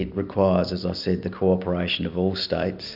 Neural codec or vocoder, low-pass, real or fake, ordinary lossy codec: none; 5.4 kHz; real; AAC, 48 kbps